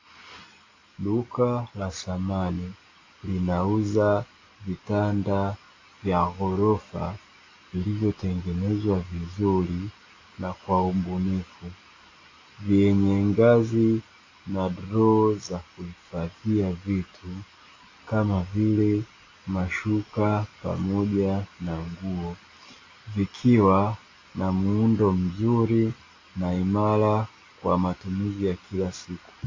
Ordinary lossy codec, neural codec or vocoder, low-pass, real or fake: AAC, 32 kbps; none; 7.2 kHz; real